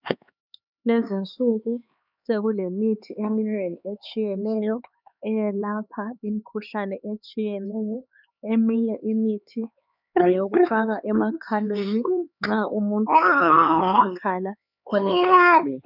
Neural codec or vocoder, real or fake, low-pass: codec, 16 kHz, 4 kbps, X-Codec, HuBERT features, trained on LibriSpeech; fake; 5.4 kHz